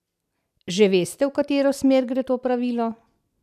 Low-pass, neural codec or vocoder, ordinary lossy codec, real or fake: 14.4 kHz; none; none; real